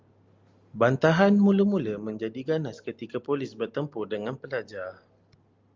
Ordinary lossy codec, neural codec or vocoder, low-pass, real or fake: Opus, 32 kbps; none; 7.2 kHz; real